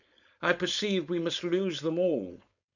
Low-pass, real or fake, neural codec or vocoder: 7.2 kHz; fake; codec, 16 kHz, 4.8 kbps, FACodec